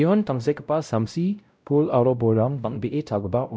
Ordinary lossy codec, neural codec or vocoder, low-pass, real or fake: none; codec, 16 kHz, 0.5 kbps, X-Codec, WavLM features, trained on Multilingual LibriSpeech; none; fake